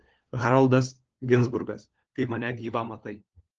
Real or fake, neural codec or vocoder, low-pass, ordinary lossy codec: fake; codec, 16 kHz, 4 kbps, FunCodec, trained on LibriTTS, 50 frames a second; 7.2 kHz; Opus, 16 kbps